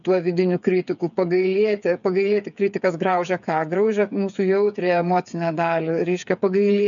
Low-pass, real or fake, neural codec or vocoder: 7.2 kHz; fake; codec, 16 kHz, 8 kbps, FreqCodec, smaller model